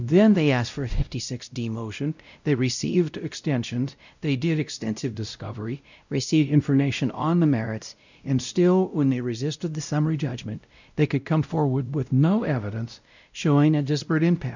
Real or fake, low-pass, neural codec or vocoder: fake; 7.2 kHz; codec, 16 kHz, 0.5 kbps, X-Codec, WavLM features, trained on Multilingual LibriSpeech